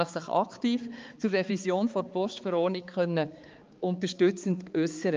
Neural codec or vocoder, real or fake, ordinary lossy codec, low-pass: codec, 16 kHz, 4 kbps, X-Codec, HuBERT features, trained on balanced general audio; fake; Opus, 24 kbps; 7.2 kHz